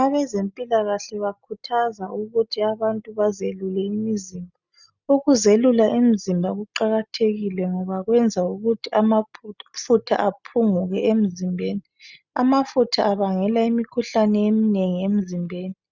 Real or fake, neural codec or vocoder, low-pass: real; none; 7.2 kHz